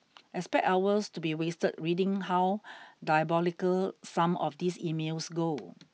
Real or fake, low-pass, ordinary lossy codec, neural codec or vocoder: real; none; none; none